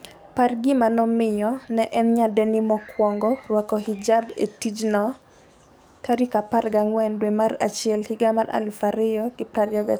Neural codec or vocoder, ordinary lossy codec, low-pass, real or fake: codec, 44.1 kHz, 7.8 kbps, DAC; none; none; fake